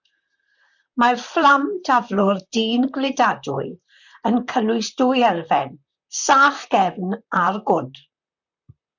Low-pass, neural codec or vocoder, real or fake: 7.2 kHz; vocoder, 44.1 kHz, 128 mel bands, Pupu-Vocoder; fake